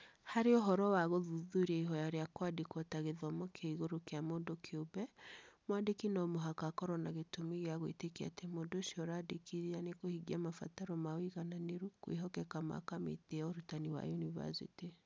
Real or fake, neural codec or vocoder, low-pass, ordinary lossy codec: real; none; none; none